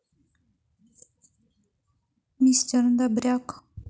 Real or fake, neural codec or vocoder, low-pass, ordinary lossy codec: real; none; none; none